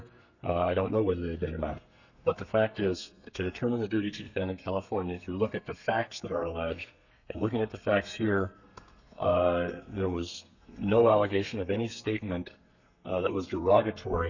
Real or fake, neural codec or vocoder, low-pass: fake; codec, 32 kHz, 1.9 kbps, SNAC; 7.2 kHz